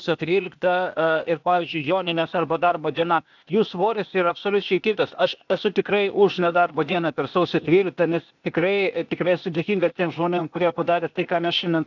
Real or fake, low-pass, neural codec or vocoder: fake; 7.2 kHz; codec, 16 kHz, 0.8 kbps, ZipCodec